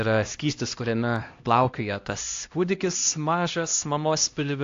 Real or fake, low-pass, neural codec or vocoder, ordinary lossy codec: fake; 7.2 kHz; codec, 16 kHz, 1 kbps, X-Codec, HuBERT features, trained on LibriSpeech; AAC, 48 kbps